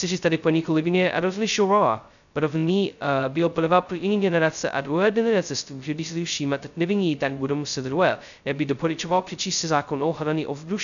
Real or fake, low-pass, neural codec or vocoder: fake; 7.2 kHz; codec, 16 kHz, 0.2 kbps, FocalCodec